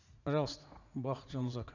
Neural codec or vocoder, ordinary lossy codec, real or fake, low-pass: vocoder, 44.1 kHz, 80 mel bands, Vocos; none; fake; 7.2 kHz